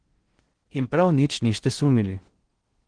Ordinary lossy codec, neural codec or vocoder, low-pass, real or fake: Opus, 16 kbps; codec, 16 kHz in and 24 kHz out, 0.6 kbps, FocalCodec, streaming, 4096 codes; 9.9 kHz; fake